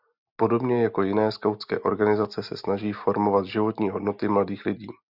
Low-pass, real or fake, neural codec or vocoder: 5.4 kHz; real; none